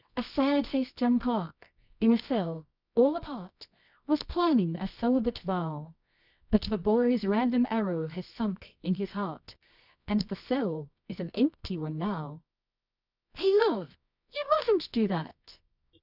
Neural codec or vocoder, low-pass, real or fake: codec, 24 kHz, 0.9 kbps, WavTokenizer, medium music audio release; 5.4 kHz; fake